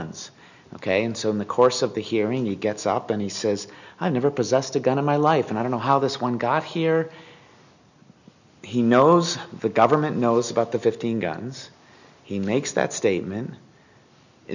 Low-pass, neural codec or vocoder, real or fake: 7.2 kHz; none; real